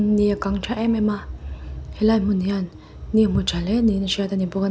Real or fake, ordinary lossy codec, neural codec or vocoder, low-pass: real; none; none; none